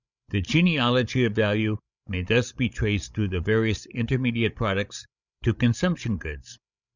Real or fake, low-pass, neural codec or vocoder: fake; 7.2 kHz; codec, 16 kHz, 16 kbps, FreqCodec, larger model